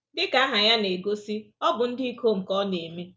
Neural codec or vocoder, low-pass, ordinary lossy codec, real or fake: none; none; none; real